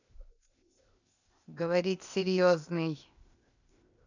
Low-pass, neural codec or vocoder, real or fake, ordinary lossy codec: 7.2 kHz; codec, 16 kHz, 0.8 kbps, ZipCodec; fake; none